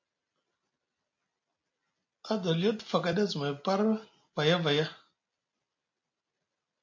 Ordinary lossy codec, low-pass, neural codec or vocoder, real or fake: MP3, 48 kbps; 7.2 kHz; none; real